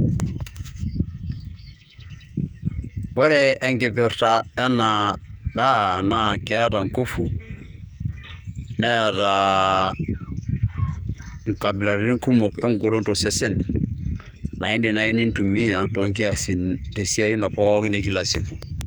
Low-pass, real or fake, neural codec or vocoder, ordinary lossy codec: none; fake; codec, 44.1 kHz, 2.6 kbps, SNAC; none